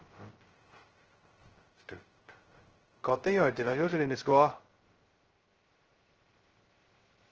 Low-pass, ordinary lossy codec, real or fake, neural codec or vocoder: 7.2 kHz; Opus, 16 kbps; fake; codec, 16 kHz, 0.2 kbps, FocalCodec